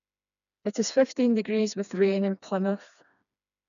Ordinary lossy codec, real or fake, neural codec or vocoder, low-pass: none; fake; codec, 16 kHz, 2 kbps, FreqCodec, smaller model; 7.2 kHz